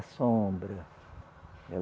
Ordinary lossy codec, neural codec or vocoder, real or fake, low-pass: none; none; real; none